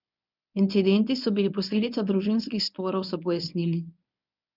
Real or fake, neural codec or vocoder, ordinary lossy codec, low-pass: fake; codec, 24 kHz, 0.9 kbps, WavTokenizer, medium speech release version 1; none; 5.4 kHz